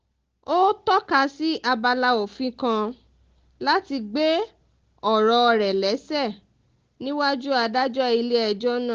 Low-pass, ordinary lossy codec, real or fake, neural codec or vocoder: 7.2 kHz; Opus, 16 kbps; real; none